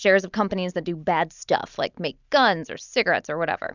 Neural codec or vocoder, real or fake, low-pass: none; real; 7.2 kHz